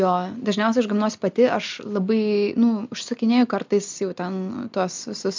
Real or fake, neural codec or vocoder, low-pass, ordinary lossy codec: real; none; 7.2 kHz; MP3, 64 kbps